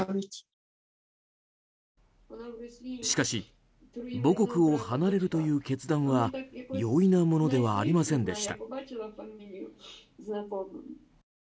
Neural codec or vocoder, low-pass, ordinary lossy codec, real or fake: none; none; none; real